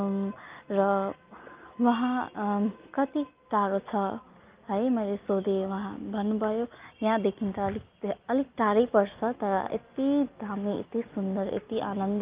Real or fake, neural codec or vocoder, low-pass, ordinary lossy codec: real; none; 3.6 kHz; Opus, 32 kbps